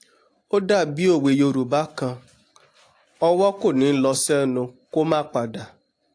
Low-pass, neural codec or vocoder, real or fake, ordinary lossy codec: 9.9 kHz; none; real; AAC, 48 kbps